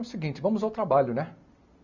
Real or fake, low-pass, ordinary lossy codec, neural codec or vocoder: real; 7.2 kHz; none; none